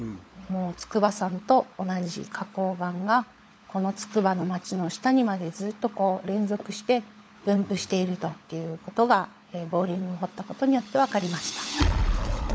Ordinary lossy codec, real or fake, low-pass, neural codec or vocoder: none; fake; none; codec, 16 kHz, 16 kbps, FunCodec, trained on LibriTTS, 50 frames a second